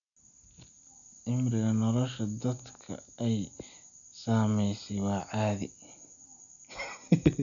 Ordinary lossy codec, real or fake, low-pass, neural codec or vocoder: none; real; 7.2 kHz; none